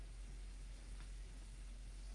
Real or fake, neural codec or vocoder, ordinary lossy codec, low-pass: fake; codec, 44.1 kHz, 3.4 kbps, Pupu-Codec; Opus, 32 kbps; 10.8 kHz